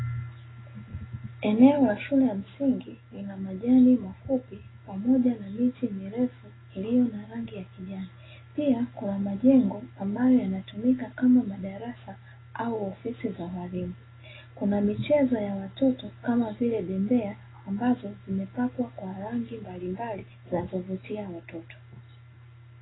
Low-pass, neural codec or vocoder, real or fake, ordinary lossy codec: 7.2 kHz; none; real; AAC, 16 kbps